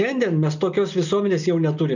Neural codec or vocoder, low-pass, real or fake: none; 7.2 kHz; real